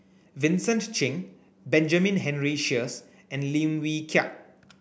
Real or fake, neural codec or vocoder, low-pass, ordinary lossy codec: real; none; none; none